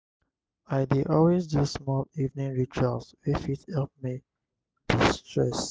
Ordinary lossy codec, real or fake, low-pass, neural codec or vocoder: none; real; none; none